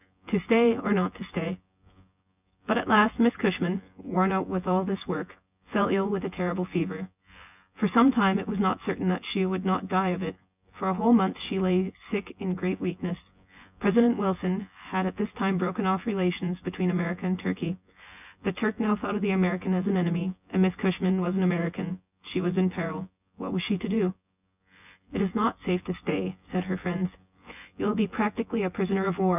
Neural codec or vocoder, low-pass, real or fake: vocoder, 24 kHz, 100 mel bands, Vocos; 3.6 kHz; fake